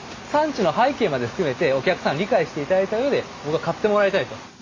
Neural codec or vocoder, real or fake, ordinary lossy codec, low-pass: none; real; AAC, 32 kbps; 7.2 kHz